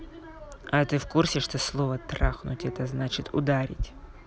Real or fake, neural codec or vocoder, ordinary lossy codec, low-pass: real; none; none; none